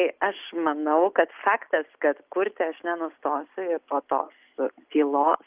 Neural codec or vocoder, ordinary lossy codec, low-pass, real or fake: none; Opus, 32 kbps; 3.6 kHz; real